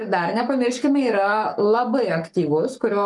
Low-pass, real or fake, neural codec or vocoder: 10.8 kHz; fake; codec, 44.1 kHz, 7.8 kbps, Pupu-Codec